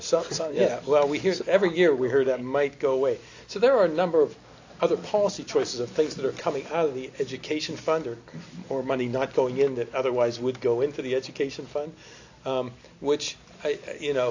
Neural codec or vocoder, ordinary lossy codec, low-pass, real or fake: none; AAC, 48 kbps; 7.2 kHz; real